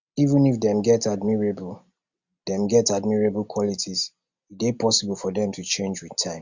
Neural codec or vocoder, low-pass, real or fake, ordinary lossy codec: none; 7.2 kHz; real; Opus, 64 kbps